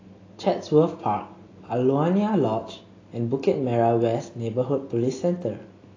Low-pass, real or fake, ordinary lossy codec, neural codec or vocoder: 7.2 kHz; real; AAC, 32 kbps; none